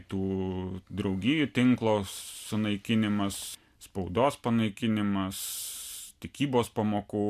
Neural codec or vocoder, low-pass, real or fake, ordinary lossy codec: none; 14.4 kHz; real; MP3, 96 kbps